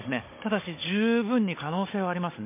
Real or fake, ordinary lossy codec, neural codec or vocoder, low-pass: fake; MP3, 24 kbps; codec, 16 kHz, 16 kbps, FunCodec, trained on Chinese and English, 50 frames a second; 3.6 kHz